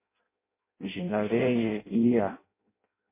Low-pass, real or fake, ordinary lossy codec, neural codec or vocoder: 3.6 kHz; fake; MP3, 24 kbps; codec, 16 kHz in and 24 kHz out, 0.6 kbps, FireRedTTS-2 codec